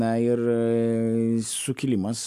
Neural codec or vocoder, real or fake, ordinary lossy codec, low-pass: none; real; AAC, 96 kbps; 14.4 kHz